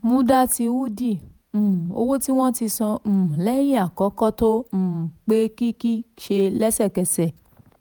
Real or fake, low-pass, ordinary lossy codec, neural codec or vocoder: fake; none; none; vocoder, 48 kHz, 128 mel bands, Vocos